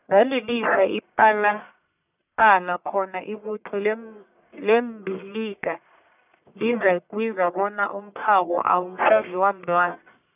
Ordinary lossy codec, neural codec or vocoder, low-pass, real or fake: none; codec, 44.1 kHz, 1.7 kbps, Pupu-Codec; 3.6 kHz; fake